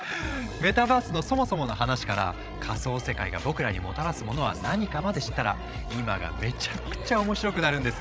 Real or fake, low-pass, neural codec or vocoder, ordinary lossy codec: fake; none; codec, 16 kHz, 16 kbps, FreqCodec, larger model; none